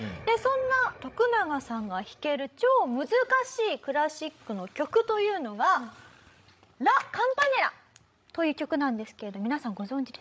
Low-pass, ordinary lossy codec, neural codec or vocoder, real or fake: none; none; codec, 16 kHz, 16 kbps, FreqCodec, larger model; fake